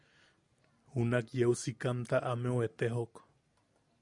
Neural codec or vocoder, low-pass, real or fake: none; 10.8 kHz; real